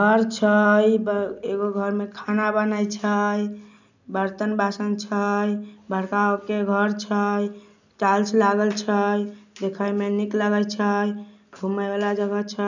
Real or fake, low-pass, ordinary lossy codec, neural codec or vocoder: real; 7.2 kHz; none; none